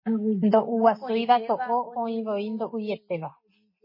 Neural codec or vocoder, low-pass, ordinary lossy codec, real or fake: none; 5.4 kHz; MP3, 24 kbps; real